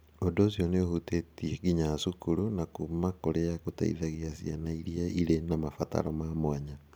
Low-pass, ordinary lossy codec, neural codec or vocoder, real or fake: none; none; none; real